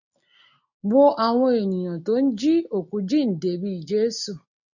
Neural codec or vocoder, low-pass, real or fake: none; 7.2 kHz; real